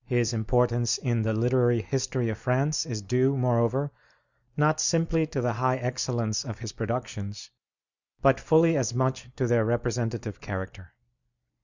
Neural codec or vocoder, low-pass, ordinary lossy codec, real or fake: none; 7.2 kHz; Opus, 64 kbps; real